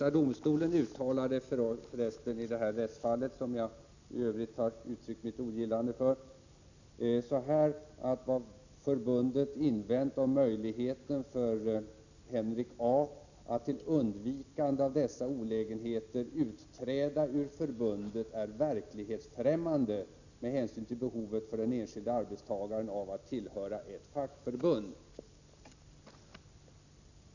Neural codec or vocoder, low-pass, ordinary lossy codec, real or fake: none; 7.2 kHz; none; real